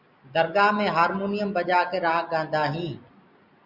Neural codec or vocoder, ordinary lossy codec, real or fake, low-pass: none; Opus, 64 kbps; real; 5.4 kHz